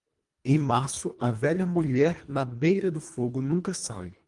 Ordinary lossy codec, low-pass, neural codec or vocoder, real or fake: Opus, 32 kbps; 10.8 kHz; codec, 24 kHz, 1.5 kbps, HILCodec; fake